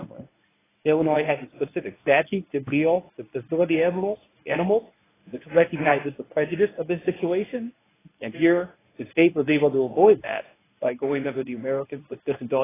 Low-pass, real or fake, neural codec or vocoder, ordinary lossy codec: 3.6 kHz; fake; codec, 24 kHz, 0.9 kbps, WavTokenizer, medium speech release version 1; AAC, 16 kbps